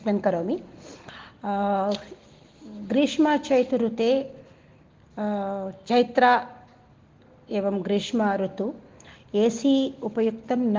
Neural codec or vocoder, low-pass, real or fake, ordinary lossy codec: none; 7.2 kHz; real; Opus, 16 kbps